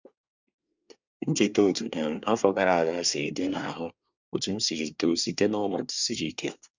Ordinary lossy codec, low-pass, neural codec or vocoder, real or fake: Opus, 64 kbps; 7.2 kHz; codec, 24 kHz, 1 kbps, SNAC; fake